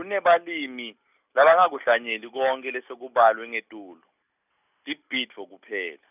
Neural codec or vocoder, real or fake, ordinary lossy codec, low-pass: none; real; none; 3.6 kHz